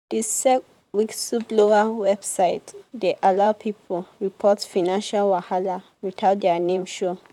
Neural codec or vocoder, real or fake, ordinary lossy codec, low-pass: vocoder, 44.1 kHz, 128 mel bands, Pupu-Vocoder; fake; none; 19.8 kHz